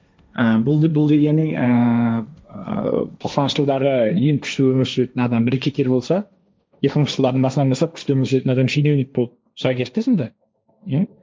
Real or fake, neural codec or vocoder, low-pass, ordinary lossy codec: fake; codec, 16 kHz, 1.1 kbps, Voila-Tokenizer; none; none